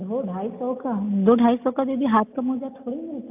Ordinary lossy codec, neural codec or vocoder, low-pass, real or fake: AAC, 32 kbps; none; 3.6 kHz; real